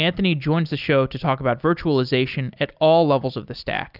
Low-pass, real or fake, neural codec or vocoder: 5.4 kHz; real; none